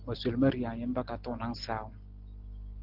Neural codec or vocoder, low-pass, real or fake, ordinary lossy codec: none; 5.4 kHz; real; Opus, 16 kbps